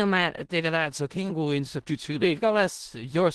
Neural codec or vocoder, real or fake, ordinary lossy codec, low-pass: codec, 16 kHz in and 24 kHz out, 0.4 kbps, LongCat-Audio-Codec, four codebook decoder; fake; Opus, 16 kbps; 10.8 kHz